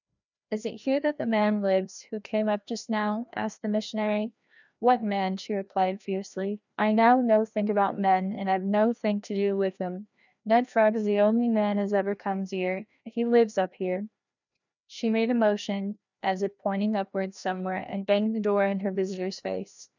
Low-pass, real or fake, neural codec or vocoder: 7.2 kHz; fake; codec, 16 kHz, 1 kbps, FreqCodec, larger model